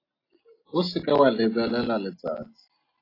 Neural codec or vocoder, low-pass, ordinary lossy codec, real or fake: none; 5.4 kHz; AAC, 24 kbps; real